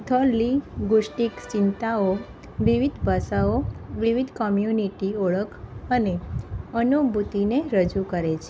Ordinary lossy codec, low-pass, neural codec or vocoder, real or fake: none; none; none; real